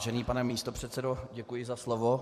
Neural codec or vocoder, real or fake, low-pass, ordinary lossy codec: vocoder, 44.1 kHz, 128 mel bands every 256 samples, BigVGAN v2; fake; 14.4 kHz; MP3, 64 kbps